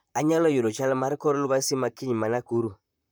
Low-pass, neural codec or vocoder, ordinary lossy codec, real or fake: none; vocoder, 44.1 kHz, 128 mel bands, Pupu-Vocoder; none; fake